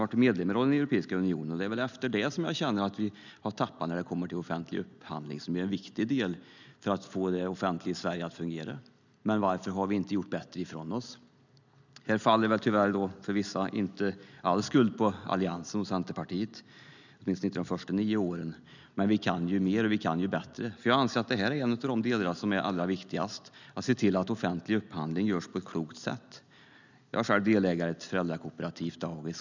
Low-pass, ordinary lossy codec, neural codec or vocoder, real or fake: 7.2 kHz; none; none; real